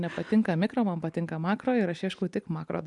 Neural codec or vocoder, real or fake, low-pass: none; real; 10.8 kHz